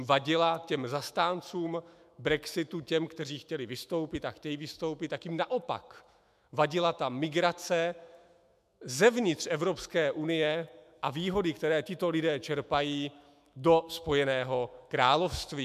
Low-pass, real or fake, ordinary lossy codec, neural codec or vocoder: 14.4 kHz; fake; MP3, 96 kbps; autoencoder, 48 kHz, 128 numbers a frame, DAC-VAE, trained on Japanese speech